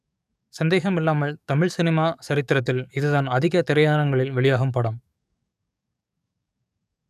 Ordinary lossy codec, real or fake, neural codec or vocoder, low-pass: none; fake; codec, 44.1 kHz, 7.8 kbps, DAC; 14.4 kHz